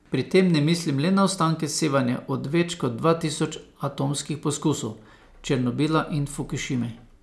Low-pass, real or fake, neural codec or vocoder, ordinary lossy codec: none; real; none; none